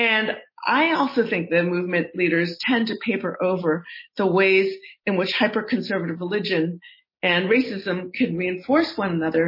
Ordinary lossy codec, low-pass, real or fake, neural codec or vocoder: MP3, 24 kbps; 5.4 kHz; real; none